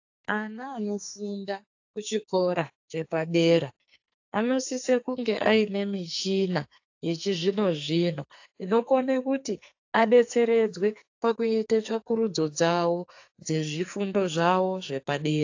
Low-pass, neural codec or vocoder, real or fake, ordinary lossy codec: 7.2 kHz; codec, 44.1 kHz, 2.6 kbps, SNAC; fake; AAC, 48 kbps